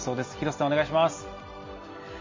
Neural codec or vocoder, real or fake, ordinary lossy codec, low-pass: none; real; MP3, 32 kbps; 7.2 kHz